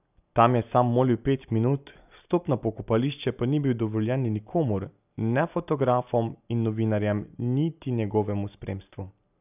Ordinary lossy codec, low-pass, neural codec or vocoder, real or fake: none; 3.6 kHz; none; real